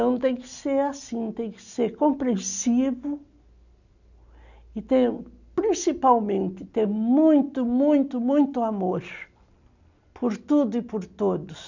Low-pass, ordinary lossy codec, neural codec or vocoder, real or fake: 7.2 kHz; none; none; real